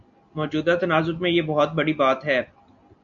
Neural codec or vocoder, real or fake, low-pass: none; real; 7.2 kHz